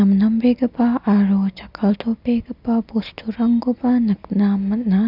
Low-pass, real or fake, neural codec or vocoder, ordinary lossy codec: 5.4 kHz; real; none; none